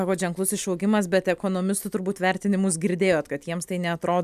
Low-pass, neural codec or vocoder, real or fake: 14.4 kHz; none; real